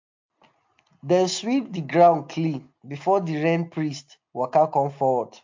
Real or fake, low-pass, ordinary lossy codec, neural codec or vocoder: real; 7.2 kHz; MP3, 48 kbps; none